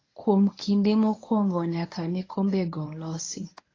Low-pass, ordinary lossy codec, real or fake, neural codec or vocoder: 7.2 kHz; AAC, 32 kbps; fake; codec, 24 kHz, 0.9 kbps, WavTokenizer, medium speech release version 1